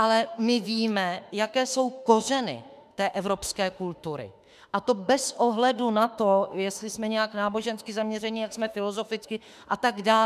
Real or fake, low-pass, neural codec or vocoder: fake; 14.4 kHz; autoencoder, 48 kHz, 32 numbers a frame, DAC-VAE, trained on Japanese speech